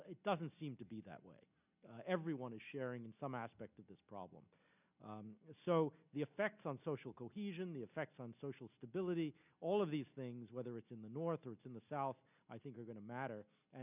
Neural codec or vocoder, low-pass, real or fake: none; 3.6 kHz; real